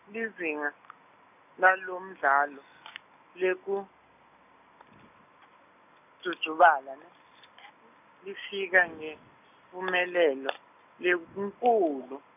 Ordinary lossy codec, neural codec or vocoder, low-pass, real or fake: none; none; 3.6 kHz; real